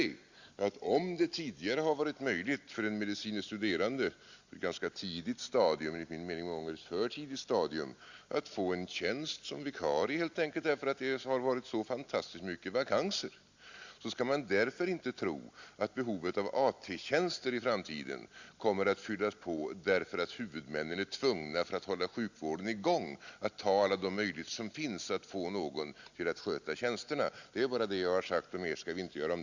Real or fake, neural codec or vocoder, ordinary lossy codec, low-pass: real; none; Opus, 64 kbps; 7.2 kHz